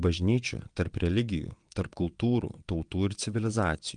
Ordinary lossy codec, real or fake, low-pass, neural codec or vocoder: Opus, 32 kbps; fake; 9.9 kHz; vocoder, 22.05 kHz, 80 mel bands, WaveNeXt